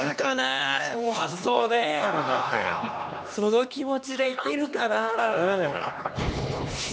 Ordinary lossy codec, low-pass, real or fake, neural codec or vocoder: none; none; fake; codec, 16 kHz, 2 kbps, X-Codec, HuBERT features, trained on LibriSpeech